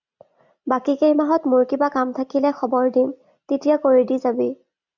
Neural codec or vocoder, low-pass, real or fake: none; 7.2 kHz; real